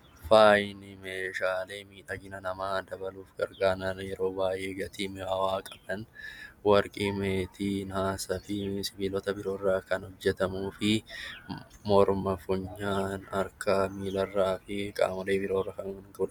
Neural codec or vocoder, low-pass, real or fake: none; 19.8 kHz; real